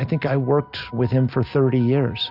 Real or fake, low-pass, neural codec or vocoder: real; 5.4 kHz; none